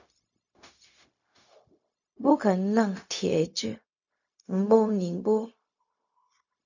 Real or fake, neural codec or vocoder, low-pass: fake; codec, 16 kHz, 0.4 kbps, LongCat-Audio-Codec; 7.2 kHz